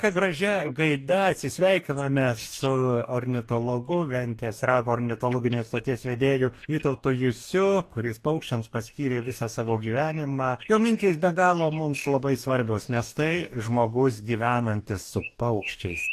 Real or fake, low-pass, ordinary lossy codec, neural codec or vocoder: fake; 14.4 kHz; AAC, 64 kbps; codec, 44.1 kHz, 2.6 kbps, DAC